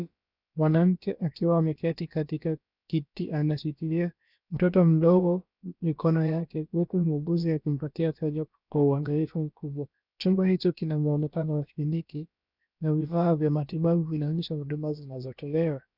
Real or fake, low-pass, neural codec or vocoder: fake; 5.4 kHz; codec, 16 kHz, about 1 kbps, DyCAST, with the encoder's durations